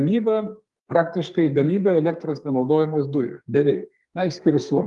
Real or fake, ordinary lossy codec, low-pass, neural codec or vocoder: fake; Opus, 32 kbps; 10.8 kHz; codec, 32 kHz, 1.9 kbps, SNAC